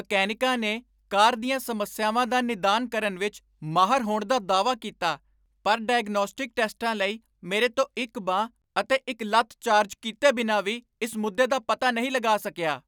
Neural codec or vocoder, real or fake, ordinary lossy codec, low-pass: none; real; none; none